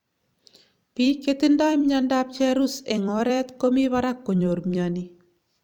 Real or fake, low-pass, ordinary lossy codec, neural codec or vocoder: fake; 19.8 kHz; none; vocoder, 44.1 kHz, 128 mel bands every 256 samples, BigVGAN v2